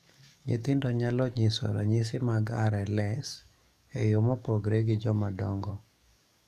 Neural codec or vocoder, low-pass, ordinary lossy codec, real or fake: codec, 44.1 kHz, 7.8 kbps, DAC; 14.4 kHz; none; fake